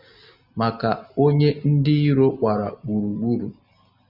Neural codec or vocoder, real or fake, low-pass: none; real; 5.4 kHz